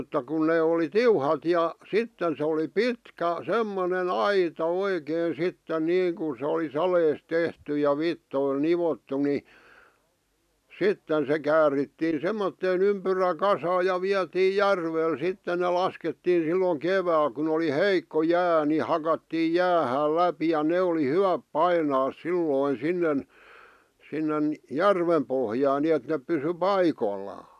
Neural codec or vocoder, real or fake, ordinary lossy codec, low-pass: none; real; none; 14.4 kHz